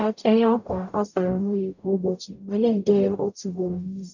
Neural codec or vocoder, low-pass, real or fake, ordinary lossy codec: codec, 44.1 kHz, 0.9 kbps, DAC; 7.2 kHz; fake; none